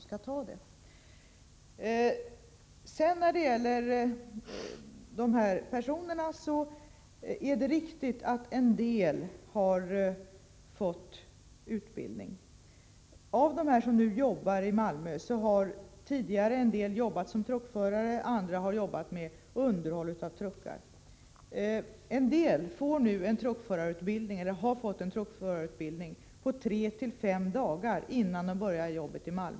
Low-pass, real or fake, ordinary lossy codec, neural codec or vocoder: none; real; none; none